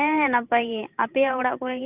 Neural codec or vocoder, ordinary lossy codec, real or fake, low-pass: vocoder, 44.1 kHz, 128 mel bands every 512 samples, BigVGAN v2; Opus, 64 kbps; fake; 3.6 kHz